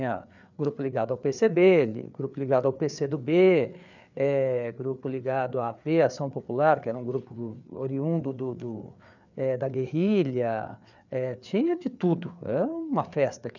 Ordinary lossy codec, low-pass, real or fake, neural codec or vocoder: none; 7.2 kHz; fake; codec, 16 kHz, 4 kbps, FreqCodec, larger model